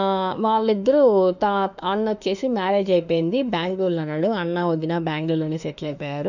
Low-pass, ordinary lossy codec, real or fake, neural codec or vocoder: 7.2 kHz; none; fake; autoencoder, 48 kHz, 32 numbers a frame, DAC-VAE, trained on Japanese speech